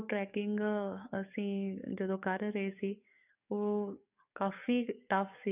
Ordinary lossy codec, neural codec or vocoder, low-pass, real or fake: none; codec, 44.1 kHz, 7.8 kbps, DAC; 3.6 kHz; fake